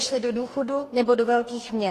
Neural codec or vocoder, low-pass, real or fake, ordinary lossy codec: codec, 44.1 kHz, 2.6 kbps, DAC; 14.4 kHz; fake; AAC, 48 kbps